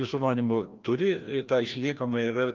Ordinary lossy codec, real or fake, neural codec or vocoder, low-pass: Opus, 32 kbps; fake; codec, 16 kHz, 1 kbps, FreqCodec, larger model; 7.2 kHz